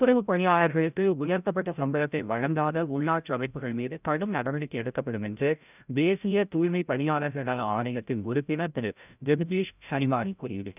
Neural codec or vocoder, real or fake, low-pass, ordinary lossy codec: codec, 16 kHz, 0.5 kbps, FreqCodec, larger model; fake; 3.6 kHz; none